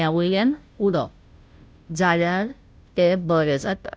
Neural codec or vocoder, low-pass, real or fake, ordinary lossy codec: codec, 16 kHz, 0.5 kbps, FunCodec, trained on Chinese and English, 25 frames a second; none; fake; none